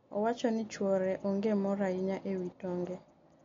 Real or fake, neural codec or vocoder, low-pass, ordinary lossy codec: real; none; 7.2 kHz; MP3, 48 kbps